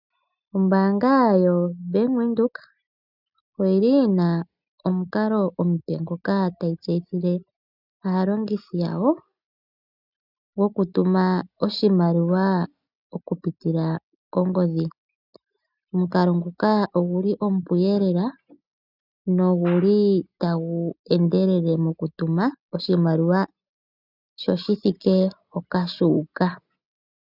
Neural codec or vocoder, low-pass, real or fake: none; 5.4 kHz; real